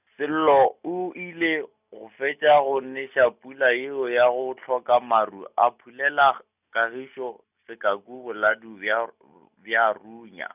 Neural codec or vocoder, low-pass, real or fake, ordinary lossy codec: none; 3.6 kHz; real; none